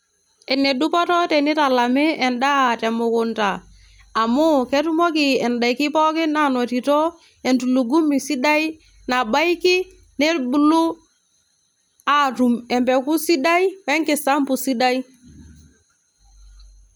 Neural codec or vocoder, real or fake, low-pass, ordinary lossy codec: none; real; none; none